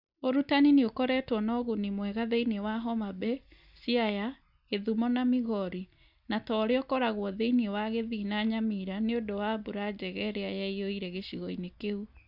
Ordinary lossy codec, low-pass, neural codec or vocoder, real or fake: none; 5.4 kHz; none; real